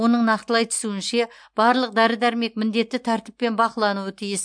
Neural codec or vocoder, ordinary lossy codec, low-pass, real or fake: none; MP3, 64 kbps; 9.9 kHz; real